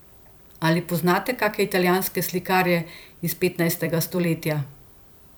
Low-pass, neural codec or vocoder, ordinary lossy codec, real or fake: none; vocoder, 44.1 kHz, 128 mel bands every 256 samples, BigVGAN v2; none; fake